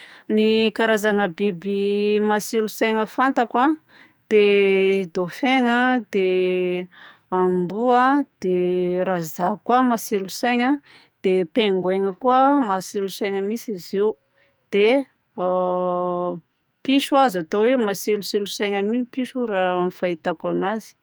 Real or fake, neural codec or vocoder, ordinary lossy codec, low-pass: fake; codec, 44.1 kHz, 2.6 kbps, SNAC; none; none